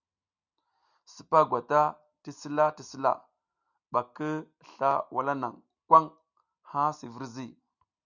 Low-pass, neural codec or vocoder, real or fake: 7.2 kHz; none; real